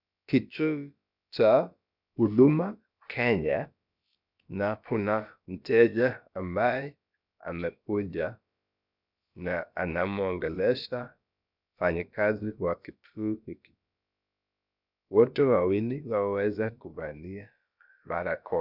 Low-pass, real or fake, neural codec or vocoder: 5.4 kHz; fake; codec, 16 kHz, about 1 kbps, DyCAST, with the encoder's durations